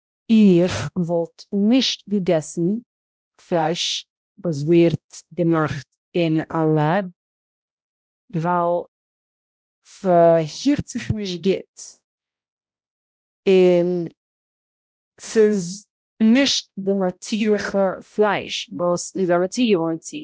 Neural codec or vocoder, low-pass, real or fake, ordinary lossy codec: codec, 16 kHz, 0.5 kbps, X-Codec, HuBERT features, trained on balanced general audio; none; fake; none